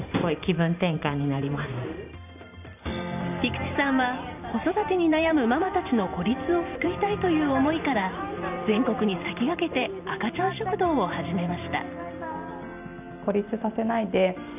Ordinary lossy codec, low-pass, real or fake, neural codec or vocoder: none; 3.6 kHz; fake; vocoder, 44.1 kHz, 128 mel bands every 512 samples, BigVGAN v2